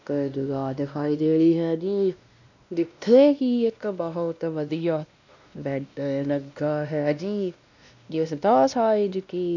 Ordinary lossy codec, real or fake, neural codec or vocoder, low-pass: none; fake; codec, 16 kHz, 1 kbps, X-Codec, WavLM features, trained on Multilingual LibriSpeech; 7.2 kHz